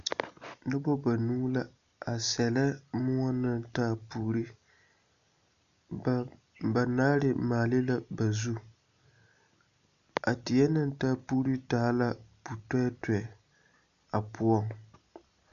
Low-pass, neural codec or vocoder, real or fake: 7.2 kHz; none; real